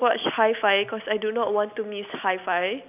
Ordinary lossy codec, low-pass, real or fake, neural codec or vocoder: none; 3.6 kHz; real; none